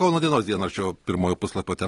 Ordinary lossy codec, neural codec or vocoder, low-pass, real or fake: AAC, 32 kbps; none; 10.8 kHz; real